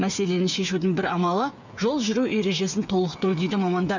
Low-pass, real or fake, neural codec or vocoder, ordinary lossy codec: 7.2 kHz; fake; codec, 16 kHz, 8 kbps, FreqCodec, smaller model; none